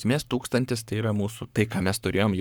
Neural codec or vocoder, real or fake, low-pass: codec, 44.1 kHz, 7.8 kbps, Pupu-Codec; fake; 19.8 kHz